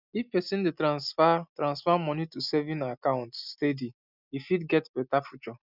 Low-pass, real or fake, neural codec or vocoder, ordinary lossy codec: 5.4 kHz; real; none; none